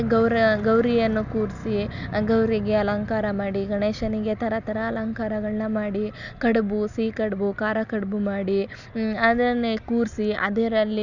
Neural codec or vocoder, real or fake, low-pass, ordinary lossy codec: none; real; 7.2 kHz; none